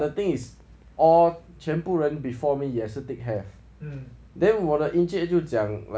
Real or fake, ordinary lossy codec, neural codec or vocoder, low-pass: real; none; none; none